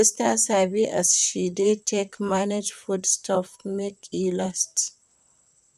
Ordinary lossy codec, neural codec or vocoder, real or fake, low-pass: none; vocoder, 44.1 kHz, 128 mel bands, Pupu-Vocoder; fake; 14.4 kHz